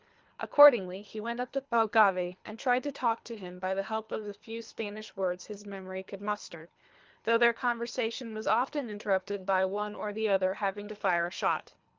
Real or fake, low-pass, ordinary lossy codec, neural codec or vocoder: fake; 7.2 kHz; Opus, 32 kbps; codec, 24 kHz, 3 kbps, HILCodec